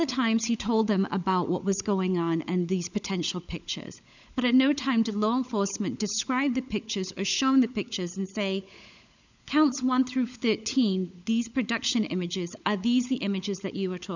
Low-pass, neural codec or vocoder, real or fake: 7.2 kHz; codec, 16 kHz, 16 kbps, FunCodec, trained on LibriTTS, 50 frames a second; fake